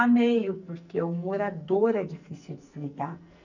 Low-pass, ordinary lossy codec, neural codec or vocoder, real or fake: 7.2 kHz; none; codec, 44.1 kHz, 2.6 kbps, SNAC; fake